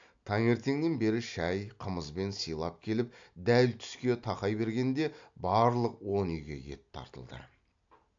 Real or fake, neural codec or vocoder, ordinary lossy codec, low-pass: real; none; AAC, 64 kbps; 7.2 kHz